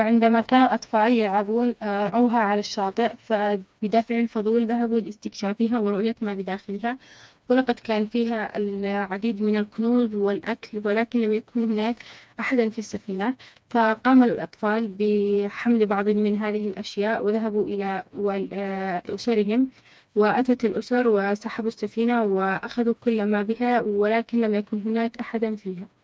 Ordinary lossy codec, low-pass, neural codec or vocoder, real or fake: none; none; codec, 16 kHz, 2 kbps, FreqCodec, smaller model; fake